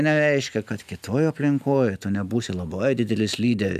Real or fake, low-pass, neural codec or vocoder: real; 14.4 kHz; none